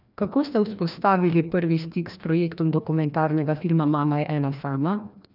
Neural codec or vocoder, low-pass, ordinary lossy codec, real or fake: codec, 16 kHz, 1 kbps, FreqCodec, larger model; 5.4 kHz; none; fake